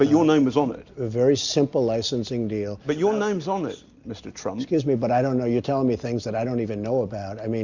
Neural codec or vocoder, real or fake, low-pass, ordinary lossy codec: none; real; 7.2 kHz; Opus, 64 kbps